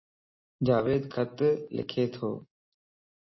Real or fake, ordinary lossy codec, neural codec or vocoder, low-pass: real; MP3, 24 kbps; none; 7.2 kHz